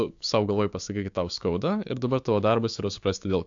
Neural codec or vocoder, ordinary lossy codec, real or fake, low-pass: codec, 16 kHz, 6 kbps, DAC; AAC, 64 kbps; fake; 7.2 kHz